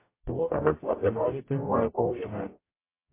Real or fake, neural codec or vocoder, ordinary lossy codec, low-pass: fake; codec, 44.1 kHz, 0.9 kbps, DAC; MP3, 24 kbps; 3.6 kHz